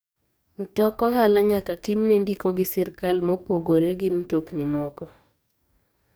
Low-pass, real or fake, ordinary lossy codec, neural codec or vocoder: none; fake; none; codec, 44.1 kHz, 2.6 kbps, DAC